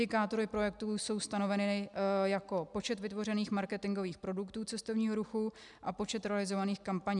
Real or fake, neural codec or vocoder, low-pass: real; none; 10.8 kHz